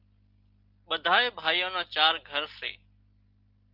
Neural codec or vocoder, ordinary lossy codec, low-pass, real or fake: none; Opus, 24 kbps; 5.4 kHz; real